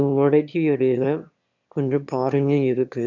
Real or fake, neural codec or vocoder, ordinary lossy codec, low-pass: fake; autoencoder, 22.05 kHz, a latent of 192 numbers a frame, VITS, trained on one speaker; none; 7.2 kHz